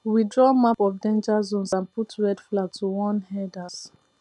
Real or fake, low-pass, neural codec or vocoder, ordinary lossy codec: real; 10.8 kHz; none; none